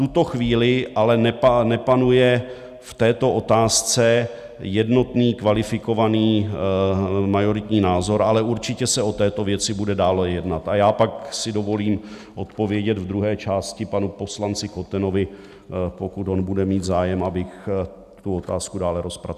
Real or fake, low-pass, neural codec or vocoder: real; 14.4 kHz; none